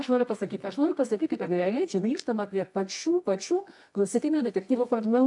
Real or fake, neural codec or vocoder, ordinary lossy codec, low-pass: fake; codec, 24 kHz, 0.9 kbps, WavTokenizer, medium music audio release; AAC, 64 kbps; 10.8 kHz